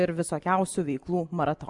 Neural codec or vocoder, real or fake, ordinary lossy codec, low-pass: none; real; MP3, 96 kbps; 10.8 kHz